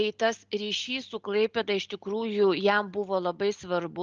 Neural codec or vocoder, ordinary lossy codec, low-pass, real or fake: none; Opus, 24 kbps; 7.2 kHz; real